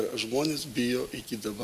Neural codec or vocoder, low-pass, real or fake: none; 14.4 kHz; real